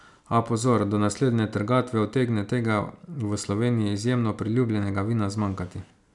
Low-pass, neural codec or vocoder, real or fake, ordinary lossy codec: 10.8 kHz; none; real; none